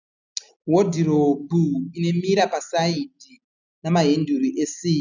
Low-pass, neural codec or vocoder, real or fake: 7.2 kHz; none; real